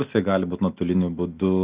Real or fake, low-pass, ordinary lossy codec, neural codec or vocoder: real; 3.6 kHz; Opus, 24 kbps; none